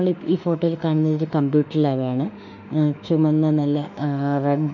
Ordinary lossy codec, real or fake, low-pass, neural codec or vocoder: none; fake; 7.2 kHz; autoencoder, 48 kHz, 32 numbers a frame, DAC-VAE, trained on Japanese speech